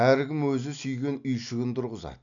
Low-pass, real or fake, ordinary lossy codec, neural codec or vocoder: 7.2 kHz; real; none; none